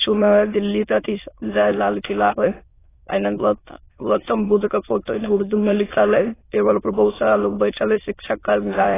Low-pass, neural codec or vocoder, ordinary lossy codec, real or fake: 3.6 kHz; autoencoder, 22.05 kHz, a latent of 192 numbers a frame, VITS, trained on many speakers; AAC, 16 kbps; fake